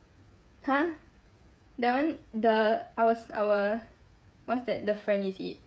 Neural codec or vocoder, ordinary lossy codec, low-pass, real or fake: codec, 16 kHz, 8 kbps, FreqCodec, smaller model; none; none; fake